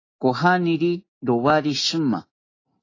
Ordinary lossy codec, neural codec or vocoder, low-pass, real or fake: AAC, 32 kbps; none; 7.2 kHz; real